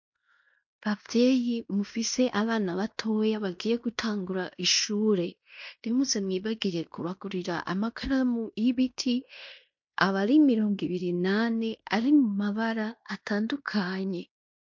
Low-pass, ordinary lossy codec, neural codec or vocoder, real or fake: 7.2 kHz; MP3, 48 kbps; codec, 16 kHz in and 24 kHz out, 0.9 kbps, LongCat-Audio-Codec, fine tuned four codebook decoder; fake